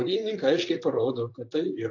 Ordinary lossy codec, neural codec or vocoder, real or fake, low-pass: AAC, 48 kbps; vocoder, 44.1 kHz, 128 mel bands, Pupu-Vocoder; fake; 7.2 kHz